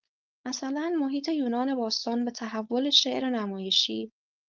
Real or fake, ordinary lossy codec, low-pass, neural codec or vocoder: fake; Opus, 32 kbps; 7.2 kHz; codec, 16 kHz, 4.8 kbps, FACodec